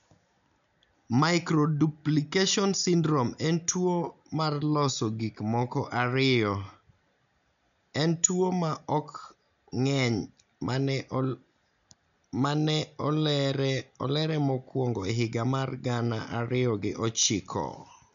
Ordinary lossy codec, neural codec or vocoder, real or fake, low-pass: none; none; real; 7.2 kHz